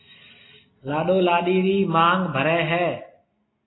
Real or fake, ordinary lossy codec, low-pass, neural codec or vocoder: real; AAC, 16 kbps; 7.2 kHz; none